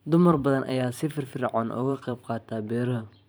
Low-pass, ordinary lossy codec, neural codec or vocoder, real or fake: none; none; vocoder, 44.1 kHz, 128 mel bands every 512 samples, BigVGAN v2; fake